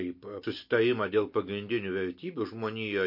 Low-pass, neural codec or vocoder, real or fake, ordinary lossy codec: 5.4 kHz; none; real; MP3, 32 kbps